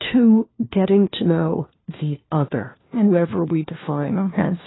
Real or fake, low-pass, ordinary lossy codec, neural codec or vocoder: fake; 7.2 kHz; AAC, 16 kbps; codec, 16 kHz, 1 kbps, FunCodec, trained on LibriTTS, 50 frames a second